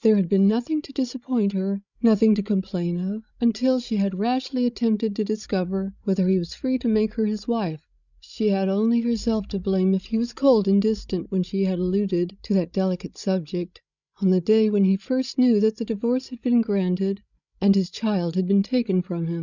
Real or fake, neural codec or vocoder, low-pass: fake; codec, 16 kHz, 16 kbps, FreqCodec, larger model; 7.2 kHz